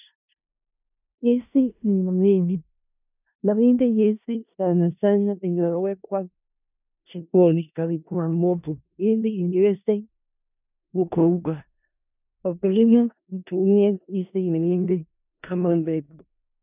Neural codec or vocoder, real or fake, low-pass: codec, 16 kHz in and 24 kHz out, 0.4 kbps, LongCat-Audio-Codec, four codebook decoder; fake; 3.6 kHz